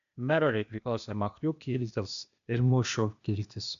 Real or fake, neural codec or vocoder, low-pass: fake; codec, 16 kHz, 0.8 kbps, ZipCodec; 7.2 kHz